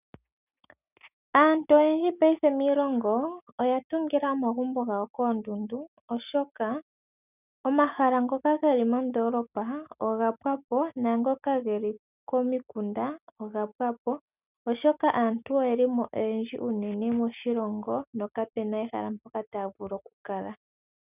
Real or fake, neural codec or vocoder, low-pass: real; none; 3.6 kHz